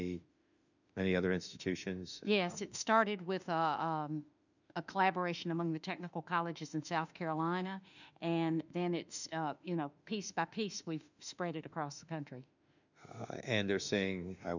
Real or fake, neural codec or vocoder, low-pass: fake; autoencoder, 48 kHz, 32 numbers a frame, DAC-VAE, trained on Japanese speech; 7.2 kHz